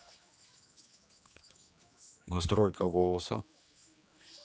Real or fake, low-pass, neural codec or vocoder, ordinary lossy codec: fake; none; codec, 16 kHz, 2 kbps, X-Codec, HuBERT features, trained on general audio; none